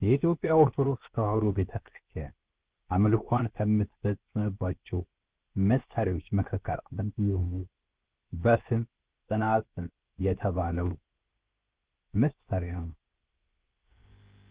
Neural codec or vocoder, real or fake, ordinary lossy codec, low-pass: codec, 16 kHz, 0.7 kbps, FocalCodec; fake; Opus, 16 kbps; 3.6 kHz